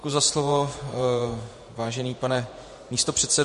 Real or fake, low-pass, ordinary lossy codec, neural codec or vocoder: fake; 14.4 kHz; MP3, 48 kbps; vocoder, 48 kHz, 128 mel bands, Vocos